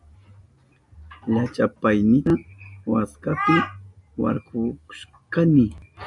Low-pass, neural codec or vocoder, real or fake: 10.8 kHz; none; real